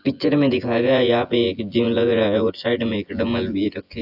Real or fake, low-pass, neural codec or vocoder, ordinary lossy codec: fake; 5.4 kHz; vocoder, 24 kHz, 100 mel bands, Vocos; AAC, 48 kbps